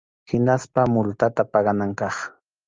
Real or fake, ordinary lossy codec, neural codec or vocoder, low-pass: real; Opus, 32 kbps; none; 7.2 kHz